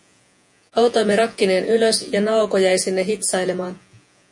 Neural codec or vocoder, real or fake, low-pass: vocoder, 48 kHz, 128 mel bands, Vocos; fake; 10.8 kHz